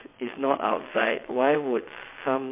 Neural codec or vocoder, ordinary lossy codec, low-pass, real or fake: vocoder, 22.05 kHz, 80 mel bands, WaveNeXt; MP3, 24 kbps; 3.6 kHz; fake